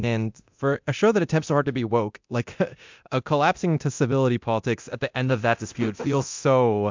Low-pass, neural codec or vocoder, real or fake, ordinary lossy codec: 7.2 kHz; codec, 24 kHz, 0.9 kbps, DualCodec; fake; MP3, 64 kbps